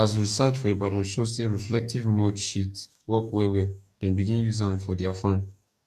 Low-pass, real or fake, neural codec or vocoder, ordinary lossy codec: 14.4 kHz; fake; codec, 44.1 kHz, 2.6 kbps, DAC; none